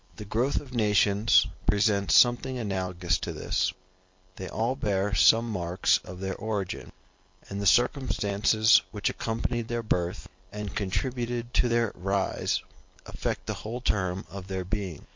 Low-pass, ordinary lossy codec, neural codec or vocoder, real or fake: 7.2 kHz; MP3, 48 kbps; none; real